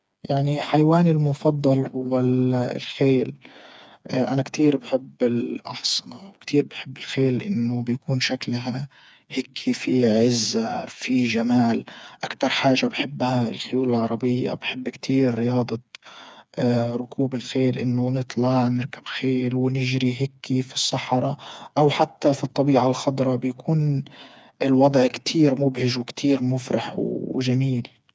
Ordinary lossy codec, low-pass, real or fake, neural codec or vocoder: none; none; fake; codec, 16 kHz, 4 kbps, FreqCodec, smaller model